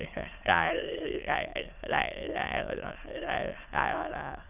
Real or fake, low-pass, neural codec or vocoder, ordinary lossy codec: fake; 3.6 kHz; autoencoder, 22.05 kHz, a latent of 192 numbers a frame, VITS, trained on many speakers; none